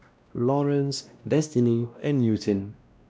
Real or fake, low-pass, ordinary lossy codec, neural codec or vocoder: fake; none; none; codec, 16 kHz, 1 kbps, X-Codec, WavLM features, trained on Multilingual LibriSpeech